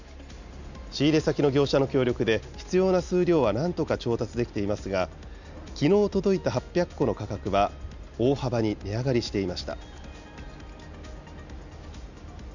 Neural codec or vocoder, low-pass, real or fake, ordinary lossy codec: none; 7.2 kHz; real; none